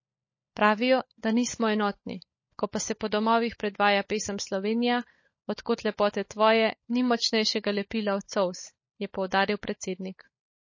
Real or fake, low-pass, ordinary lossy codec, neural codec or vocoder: fake; 7.2 kHz; MP3, 32 kbps; codec, 16 kHz, 16 kbps, FunCodec, trained on LibriTTS, 50 frames a second